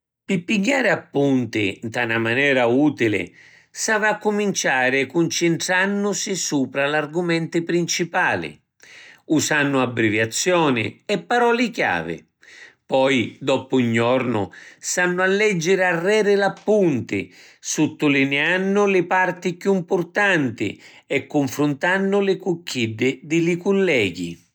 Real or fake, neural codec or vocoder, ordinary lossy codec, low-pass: real; none; none; none